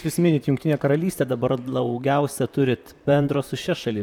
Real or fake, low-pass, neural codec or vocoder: fake; 19.8 kHz; vocoder, 44.1 kHz, 128 mel bands, Pupu-Vocoder